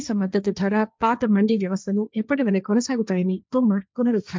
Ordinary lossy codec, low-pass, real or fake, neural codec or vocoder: none; none; fake; codec, 16 kHz, 1.1 kbps, Voila-Tokenizer